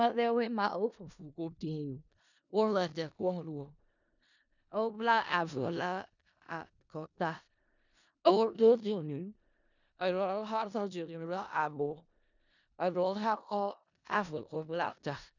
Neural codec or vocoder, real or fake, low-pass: codec, 16 kHz in and 24 kHz out, 0.4 kbps, LongCat-Audio-Codec, four codebook decoder; fake; 7.2 kHz